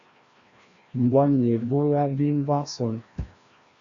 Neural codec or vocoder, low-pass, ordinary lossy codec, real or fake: codec, 16 kHz, 1 kbps, FreqCodec, larger model; 7.2 kHz; Opus, 64 kbps; fake